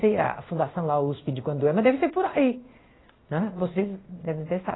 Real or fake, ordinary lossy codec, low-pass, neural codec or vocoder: fake; AAC, 16 kbps; 7.2 kHz; codec, 16 kHz in and 24 kHz out, 1 kbps, XY-Tokenizer